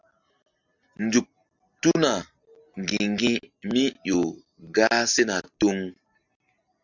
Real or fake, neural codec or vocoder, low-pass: real; none; 7.2 kHz